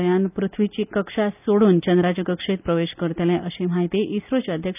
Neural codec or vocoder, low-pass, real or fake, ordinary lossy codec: none; 3.6 kHz; real; none